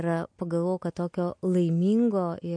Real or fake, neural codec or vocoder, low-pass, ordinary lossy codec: real; none; 9.9 kHz; MP3, 48 kbps